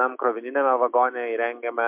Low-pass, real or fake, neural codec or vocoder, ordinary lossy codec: 3.6 kHz; fake; autoencoder, 48 kHz, 128 numbers a frame, DAC-VAE, trained on Japanese speech; MP3, 32 kbps